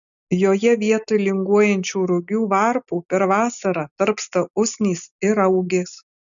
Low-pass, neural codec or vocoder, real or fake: 7.2 kHz; none; real